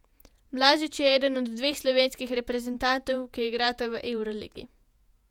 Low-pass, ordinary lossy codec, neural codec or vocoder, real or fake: 19.8 kHz; none; vocoder, 48 kHz, 128 mel bands, Vocos; fake